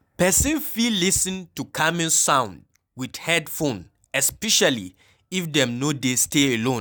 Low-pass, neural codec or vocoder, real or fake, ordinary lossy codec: none; none; real; none